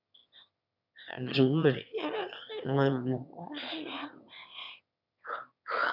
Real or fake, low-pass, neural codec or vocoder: fake; 5.4 kHz; autoencoder, 22.05 kHz, a latent of 192 numbers a frame, VITS, trained on one speaker